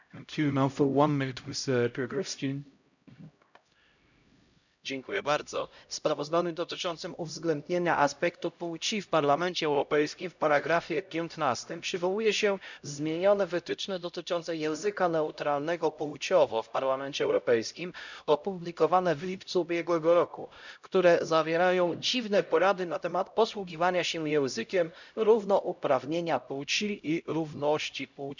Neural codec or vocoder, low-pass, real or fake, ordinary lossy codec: codec, 16 kHz, 0.5 kbps, X-Codec, HuBERT features, trained on LibriSpeech; 7.2 kHz; fake; none